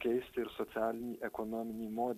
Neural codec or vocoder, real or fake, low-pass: none; real; 14.4 kHz